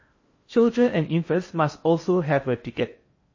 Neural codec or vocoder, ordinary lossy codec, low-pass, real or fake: codec, 16 kHz in and 24 kHz out, 0.6 kbps, FocalCodec, streaming, 4096 codes; MP3, 32 kbps; 7.2 kHz; fake